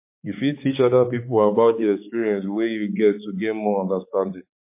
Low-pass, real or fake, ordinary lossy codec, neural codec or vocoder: 3.6 kHz; fake; MP3, 24 kbps; codec, 16 kHz, 4 kbps, X-Codec, HuBERT features, trained on balanced general audio